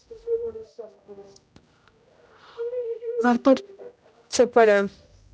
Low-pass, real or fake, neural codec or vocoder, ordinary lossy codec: none; fake; codec, 16 kHz, 0.5 kbps, X-Codec, HuBERT features, trained on general audio; none